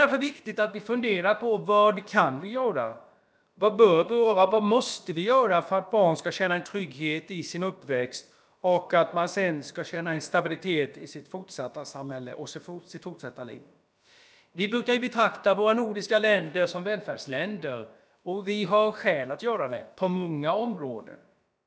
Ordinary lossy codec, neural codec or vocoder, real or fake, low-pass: none; codec, 16 kHz, about 1 kbps, DyCAST, with the encoder's durations; fake; none